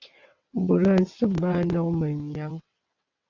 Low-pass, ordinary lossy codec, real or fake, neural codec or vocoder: 7.2 kHz; AAC, 48 kbps; fake; vocoder, 22.05 kHz, 80 mel bands, WaveNeXt